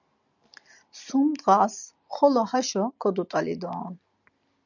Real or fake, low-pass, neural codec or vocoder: real; 7.2 kHz; none